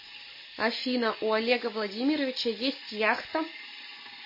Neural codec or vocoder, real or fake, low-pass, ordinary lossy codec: none; real; 5.4 kHz; MP3, 24 kbps